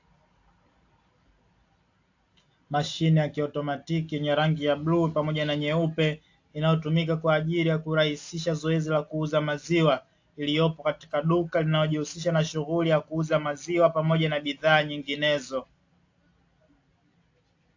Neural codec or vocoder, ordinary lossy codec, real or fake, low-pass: none; AAC, 48 kbps; real; 7.2 kHz